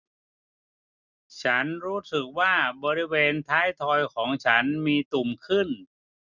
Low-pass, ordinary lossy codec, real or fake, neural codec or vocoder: 7.2 kHz; none; real; none